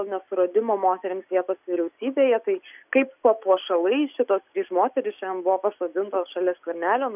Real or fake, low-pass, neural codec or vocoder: real; 3.6 kHz; none